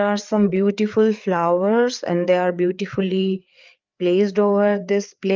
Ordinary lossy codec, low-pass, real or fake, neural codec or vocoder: Opus, 32 kbps; 7.2 kHz; fake; codec, 16 kHz, 4 kbps, FreqCodec, larger model